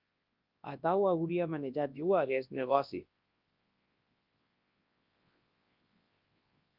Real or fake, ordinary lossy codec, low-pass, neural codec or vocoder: fake; Opus, 24 kbps; 5.4 kHz; codec, 24 kHz, 0.9 kbps, WavTokenizer, large speech release